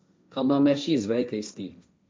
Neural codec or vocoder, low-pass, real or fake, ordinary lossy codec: codec, 16 kHz, 1.1 kbps, Voila-Tokenizer; none; fake; none